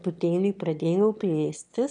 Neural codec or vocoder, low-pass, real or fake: autoencoder, 22.05 kHz, a latent of 192 numbers a frame, VITS, trained on one speaker; 9.9 kHz; fake